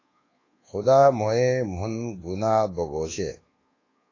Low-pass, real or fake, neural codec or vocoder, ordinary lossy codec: 7.2 kHz; fake; codec, 24 kHz, 1.2 kbps, DualCodec; AAC, 32 kbps